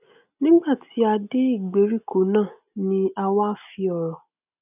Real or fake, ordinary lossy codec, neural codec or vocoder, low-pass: real; none; none; 3.6 kHz